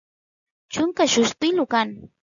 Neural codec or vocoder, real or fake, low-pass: none; real; 7.2 kHz